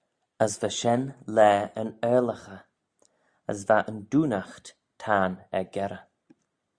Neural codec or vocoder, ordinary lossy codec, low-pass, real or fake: none; Opus, 64 kbps; 9.9 kHz; real